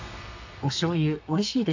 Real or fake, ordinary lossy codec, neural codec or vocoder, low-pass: fake; AAC, 48 kbps; codec, 32 kHz, 1.9 kbps, SNAC; 7.2 kHz